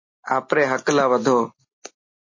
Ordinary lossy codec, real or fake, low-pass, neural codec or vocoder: MP3, 32 kbps; real; 7.2 kHz; none